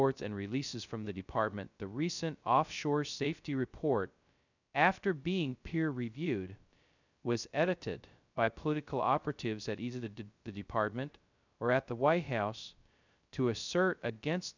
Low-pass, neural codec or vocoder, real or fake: 7.2 kHz; codec, 16 kHz, 0.2 kbps, FocalCodec; fake